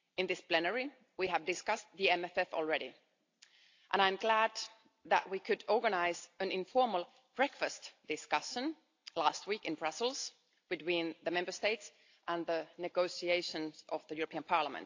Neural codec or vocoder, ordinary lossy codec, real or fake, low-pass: none; AAC, 48 kbps; real; 7.2 kHz